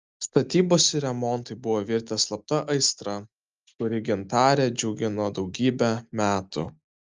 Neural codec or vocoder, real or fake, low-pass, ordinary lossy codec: none; real; 7.2 kHz; Opus, 24 kbps